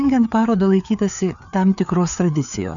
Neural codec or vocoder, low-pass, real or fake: codec, 16 kHz, 4 kbps, FreqCodec, larger model; 7.2 kHz; fake